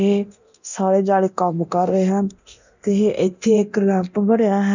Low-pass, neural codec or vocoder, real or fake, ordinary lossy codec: 7.2 kHz; codec, 24 kHz, 0.9 kbps, DualCodec; fake; none